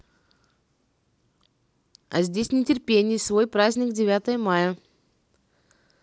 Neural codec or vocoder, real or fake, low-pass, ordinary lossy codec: none; real; none; none